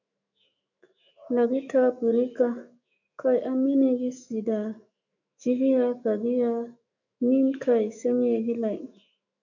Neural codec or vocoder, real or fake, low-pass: autoencoder, 48 kHz, 128 numbers a frame, DAC-VAE, trained on Japanese speech; fake; 7.2 kHz